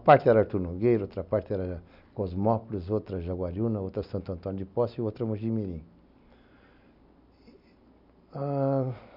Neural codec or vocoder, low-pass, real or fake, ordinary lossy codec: none; 5.4 kHz; real; none